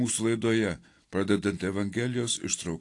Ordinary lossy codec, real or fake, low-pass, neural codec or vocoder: AAC, 48 kbps; real; 10.8 kHz; none